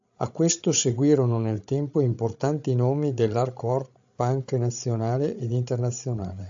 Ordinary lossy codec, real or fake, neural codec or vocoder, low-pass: AAC, 64 kbps; fake; codec, 16 kHz, 16 kbps, FreqCodec, larger model; 7.2 kHz